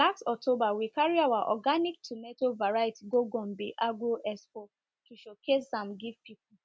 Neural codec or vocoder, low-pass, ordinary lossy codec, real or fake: none; none; none; real